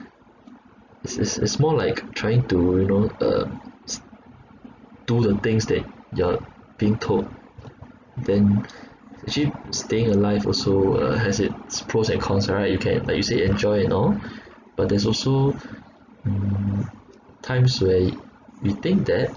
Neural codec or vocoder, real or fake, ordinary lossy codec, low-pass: none; real; none; 7.2 kHz